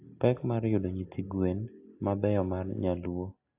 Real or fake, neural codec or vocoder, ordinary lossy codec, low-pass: real; none; AAC, 32 kbps; 3.6 kHz